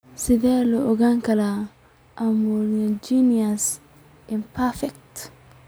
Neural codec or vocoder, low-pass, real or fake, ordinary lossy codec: none; none; real; none